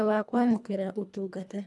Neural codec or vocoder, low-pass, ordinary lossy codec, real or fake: codec, 24 kHz, 1.5 kbps, HILCodec; none; none; fake